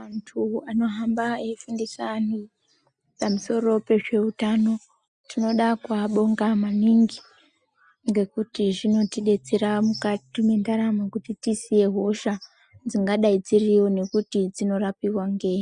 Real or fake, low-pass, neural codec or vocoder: real; 9.9 kHz; none